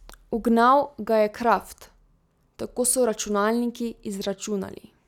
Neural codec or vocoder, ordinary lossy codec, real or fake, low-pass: none; none; real; 19.8 kHz